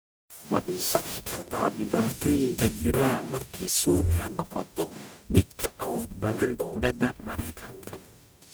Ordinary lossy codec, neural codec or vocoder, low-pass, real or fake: none; codec, 44.1 kHz, 0.9 kbps, DAC; none; fake